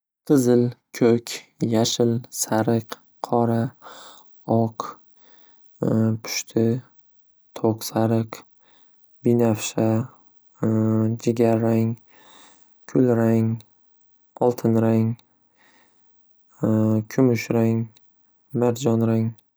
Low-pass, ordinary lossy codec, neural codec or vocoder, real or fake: none; none; none; real